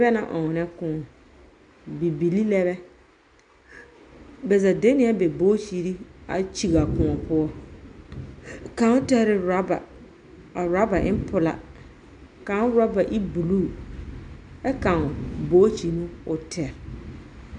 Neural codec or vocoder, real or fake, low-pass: none; real; 9.9 kHz